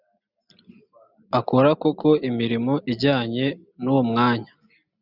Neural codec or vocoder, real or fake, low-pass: none; real; 5.4 kHz